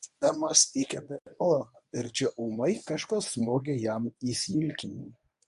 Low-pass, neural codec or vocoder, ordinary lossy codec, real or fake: 10.8 kHz; codec, 24 kHz, 0.9 kbps, WavTokenizer, medium speech release version 1; AAC, 96 kbps; fake